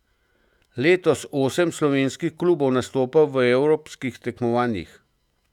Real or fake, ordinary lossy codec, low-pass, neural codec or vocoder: fake; none; 19.8 kHz; autoencoder, 48 kHz, 128 numbers a frame, DAC-VAE, trained on Japanese speech